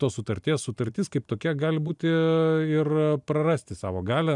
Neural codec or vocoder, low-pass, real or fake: vocoder, 44.1 kHz, 128 mel bands every 256 samples, BigVGAN v2; 10.8 kHz; fake